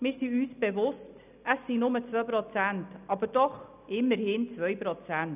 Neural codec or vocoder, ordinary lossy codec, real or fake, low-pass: none; none; real; 3.6 kHz